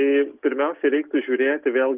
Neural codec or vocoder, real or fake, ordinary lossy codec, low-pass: none; real; Opus, 32 kbps; 3.6 kHz